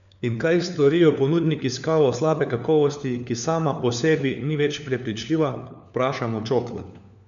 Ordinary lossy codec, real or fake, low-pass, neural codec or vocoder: none; fake; 7.2 kHz; codec, 16 kHz, 4 kbps, FunCodec, trained on LibriTTS, 50 frames a second